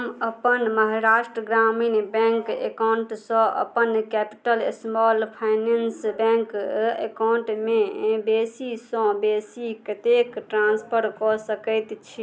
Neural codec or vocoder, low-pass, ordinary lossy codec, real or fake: none; none; none; real